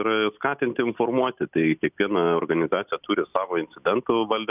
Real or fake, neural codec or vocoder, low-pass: real; none; 3.6 kHz